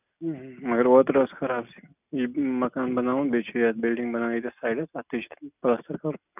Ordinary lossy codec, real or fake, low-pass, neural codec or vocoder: none; real; 3.6 kHz; none